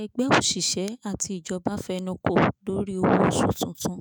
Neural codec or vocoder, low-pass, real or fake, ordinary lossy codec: autoencoder, 48 kHz, 128 numbers a frame, DAC-VAE, trained on Japanese speech; none; fake; none